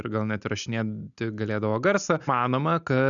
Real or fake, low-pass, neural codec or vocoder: real; 7.2 kHz; none